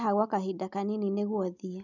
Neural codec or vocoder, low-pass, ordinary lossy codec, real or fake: none; 7.2 kHz; none; real